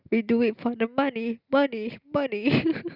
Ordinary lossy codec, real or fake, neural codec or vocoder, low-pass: Opus, 64 kbps; real; none; 5.4 kHz